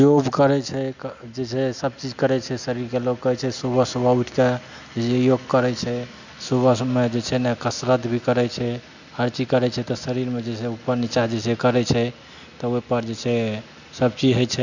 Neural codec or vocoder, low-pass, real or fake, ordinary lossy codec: none; 7.2 kHz; real; none